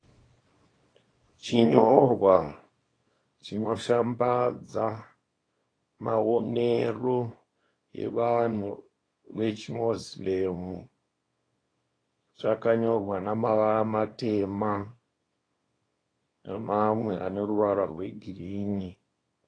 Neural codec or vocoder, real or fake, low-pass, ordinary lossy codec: codec, 24 kHz, 0.9 kbps, WavTokenizer, small release; fake; 9.9 kHz; AAC, 32 kbps